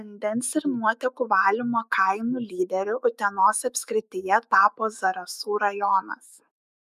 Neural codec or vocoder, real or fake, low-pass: autoencoder, 48 kHz, 128 numbers a frame, DAC-VAE, trained on Japanese speech; fake; 14.4 kHz